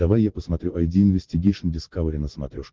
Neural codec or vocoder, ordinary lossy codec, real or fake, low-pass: none; Opus, 16 kbps; real; 7.2 kHz